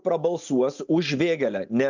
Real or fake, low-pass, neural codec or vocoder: real; 7.2 kHz; none